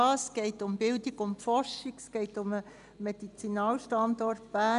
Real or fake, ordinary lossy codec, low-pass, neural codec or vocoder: real; none; 10.8 kHz; none